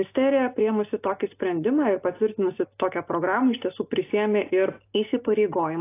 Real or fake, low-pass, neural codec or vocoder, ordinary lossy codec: real; 3.6 kHz; none; AAC, 24 kbps